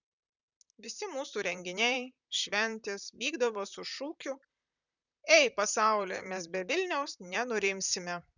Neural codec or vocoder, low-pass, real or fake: vocoder, 44.1 kHz, 128 mel bands, Pupu-Vocoder; 7.2 kHz; fake